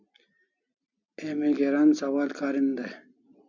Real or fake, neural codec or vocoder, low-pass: real; none; 7.2 kHz